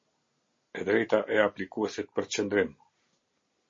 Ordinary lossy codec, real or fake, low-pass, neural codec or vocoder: MP3, 32 kbps; real; 7.2 kHz; none